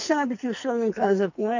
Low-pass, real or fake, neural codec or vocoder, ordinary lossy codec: 7.2 kHz; fake; codec, 44.1 kHz, 2.6 kbps, SNAC; none